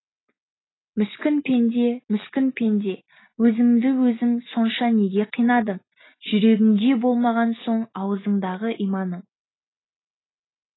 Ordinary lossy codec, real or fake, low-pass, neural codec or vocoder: AAC, 16 kbps; real; 7.2 kHz; none